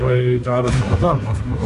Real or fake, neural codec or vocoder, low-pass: fake; codec, 24 kHz, 1 kbps, SNAC; 10.8 kHz